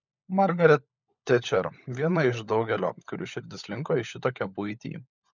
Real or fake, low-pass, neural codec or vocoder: fake; 7.2 kHz; codec, 16 kHz, 16 kbps, FunCodec, trained on LibriTTS, 50 frames a second